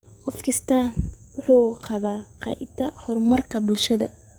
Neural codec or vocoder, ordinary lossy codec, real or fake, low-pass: codec, 44.1 kHz, 2.6 kbps, SNAC; none; fake; none